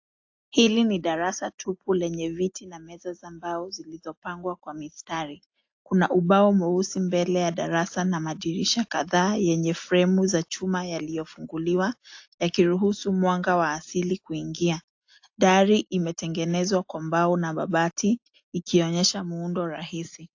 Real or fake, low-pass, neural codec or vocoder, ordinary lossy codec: real; 7.2 kHz; none; AAC, 48 kbps